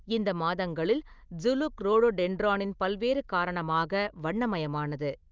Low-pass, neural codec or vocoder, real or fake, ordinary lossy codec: 7.2 kHz; none; real; Opus, 24 kbps